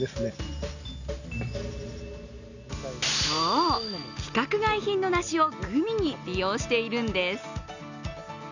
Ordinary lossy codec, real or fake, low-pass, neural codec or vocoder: none; real; 7.2 kHz; none